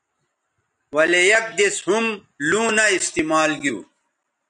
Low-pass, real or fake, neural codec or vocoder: 10.8 kHz; real; none